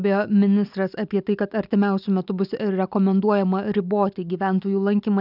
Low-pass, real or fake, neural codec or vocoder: 5.4 kHz; real; none